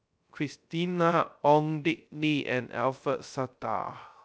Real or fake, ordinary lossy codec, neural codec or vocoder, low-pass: fake; none; codec, 16 kHz, 0.3 kbps, FocalCodec; none